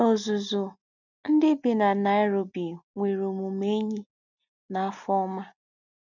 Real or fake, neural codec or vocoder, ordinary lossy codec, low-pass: real; none; none; 7.2 kHz